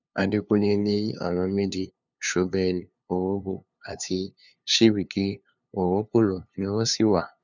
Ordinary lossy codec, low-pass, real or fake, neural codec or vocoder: none; 7.2 kHz; fake; codec, 16 kHz, 2 kbps, FunCodec, trained on LibriTTS, 25 frames a second